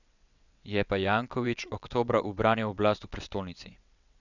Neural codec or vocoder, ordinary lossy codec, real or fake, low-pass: vocoder, 22.05 kHz, 80 mel bands, WaveNeXt; none; fake; 7.2 kHz